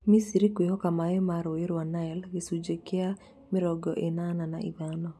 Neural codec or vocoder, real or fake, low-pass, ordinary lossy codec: none; real; none; none